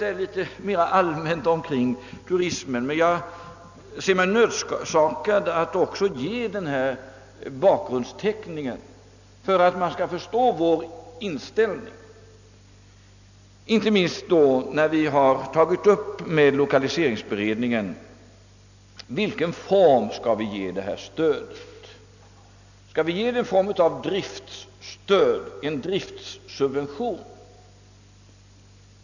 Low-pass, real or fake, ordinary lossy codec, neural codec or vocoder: 7.2 kHz; real; none; none